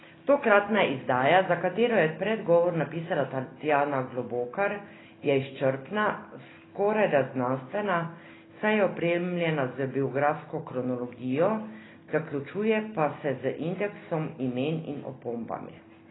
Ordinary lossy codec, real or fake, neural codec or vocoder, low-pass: AAC, 16 kbps; real; none; 7.2 kHz